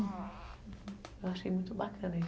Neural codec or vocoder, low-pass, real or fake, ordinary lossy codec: none; none; real; none